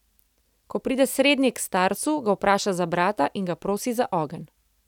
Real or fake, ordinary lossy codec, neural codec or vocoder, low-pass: real; none; none; 19.8 kHz